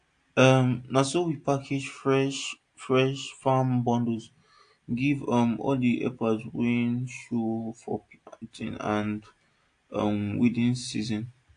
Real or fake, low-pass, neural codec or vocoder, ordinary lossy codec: real; 9.9 kHz; none; AAC, 48 kbps